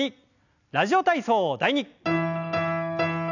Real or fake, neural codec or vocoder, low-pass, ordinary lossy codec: real; none; 7.2 kHz; none